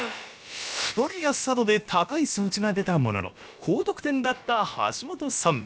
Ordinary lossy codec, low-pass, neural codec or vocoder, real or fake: none; none; codec, 16 kHz, about 1 kbps, DyCAST, with the encoder's durations; fake